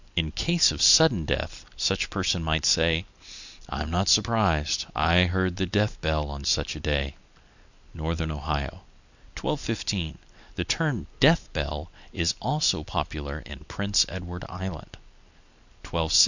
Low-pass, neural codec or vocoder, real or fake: 7.2 kHz; none; real